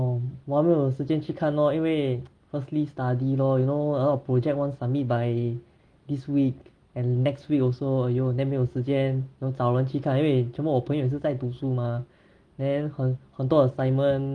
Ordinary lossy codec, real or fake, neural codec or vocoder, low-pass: Opus, 24 kbps; real; none; 9.9 kHz